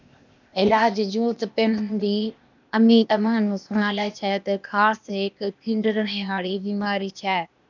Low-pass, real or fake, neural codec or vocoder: 7.2 kHz; fake; codec, 16 kHz, 0.8 kbps, ZipCodec